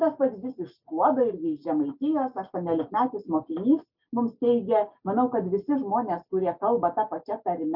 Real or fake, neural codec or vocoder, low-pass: real; none; 5.4 kHz